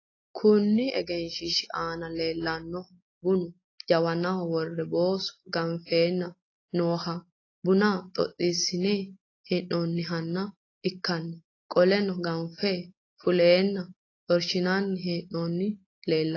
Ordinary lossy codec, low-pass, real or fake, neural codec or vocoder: AAC, 32 kbps; 7.2 kHz; real; none